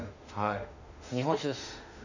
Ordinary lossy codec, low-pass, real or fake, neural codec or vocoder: none; 7.2 kHz; fake; autoencoder, 48 kHz, 32 numbers a frame, DAC-VAE, trained on Japanese speech